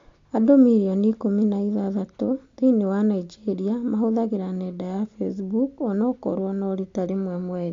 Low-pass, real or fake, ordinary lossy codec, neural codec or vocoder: 7.2 kHz; real; AAC, 48 kbps; none